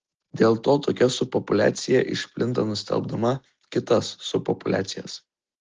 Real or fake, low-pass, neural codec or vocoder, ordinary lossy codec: real; 7.2 kHz; none; Opus, 16 kbps